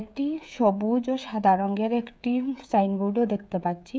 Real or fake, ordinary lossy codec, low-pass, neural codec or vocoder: fake; none; none; codec, 16 kHz, 16 kbps, FreqCodec, smaller model